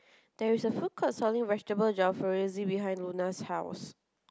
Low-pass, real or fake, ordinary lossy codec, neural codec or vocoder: none; real; none; none